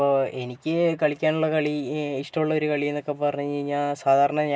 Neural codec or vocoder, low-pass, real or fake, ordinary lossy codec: none; none; real; none